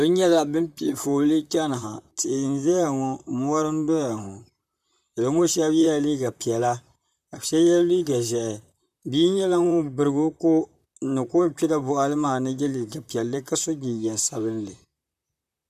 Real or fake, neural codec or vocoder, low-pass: fake; vocoder, 44.1 kHz, 128 mel bands, Pupu-Vocoder; 14.4 kHz